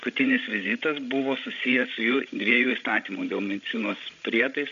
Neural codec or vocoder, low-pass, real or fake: codec, 16 kHz, 16 kbps, FreqCodec, larger model; 7.2 kHz; fake